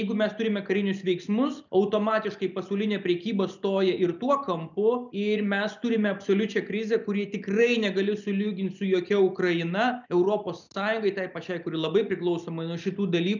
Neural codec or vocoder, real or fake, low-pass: none; real; 7.2 kHz